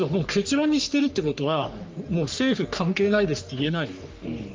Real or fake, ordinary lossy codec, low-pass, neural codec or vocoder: fake; Opus, 32 kbps; 7.2 kHz; codec, 44.1 kHz, 3.4 kbps, Pupu-Codec